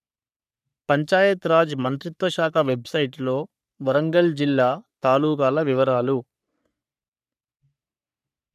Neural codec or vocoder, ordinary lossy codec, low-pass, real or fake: codec, 44.1 kHz, 3.4 kbps, Pupu-Codec; none; 14.4 kHz; fake